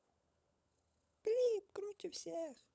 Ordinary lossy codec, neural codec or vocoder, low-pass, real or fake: none; codec, 16 kHz, 16 kbps, FunCodec, trained on LibriTTS, 50 frames a second; none; fake